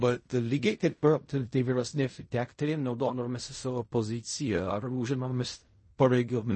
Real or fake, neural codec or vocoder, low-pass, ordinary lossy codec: fake; codec, 16 kHz in and 24 kHz out, 0.4 kbps, LongCat-Audio-Codec, fine tuned four codebook decoder; 10.8 kHz; MP3, 32 kbps